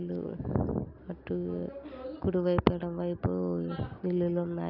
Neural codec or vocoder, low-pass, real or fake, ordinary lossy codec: none; 5.4 kHz; real; none